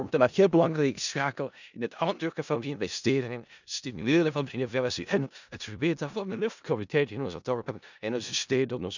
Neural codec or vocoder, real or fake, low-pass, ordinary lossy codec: codec, 16 kHz in and 24 kHz out, 0.4 kbps, LongCat-Audio-Codec, four codebook decoder; fake; 7.2 kHz; none